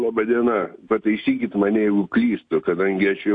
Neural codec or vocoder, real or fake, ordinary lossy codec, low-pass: none; real; AAC, 48 kbps; 9.9 kHz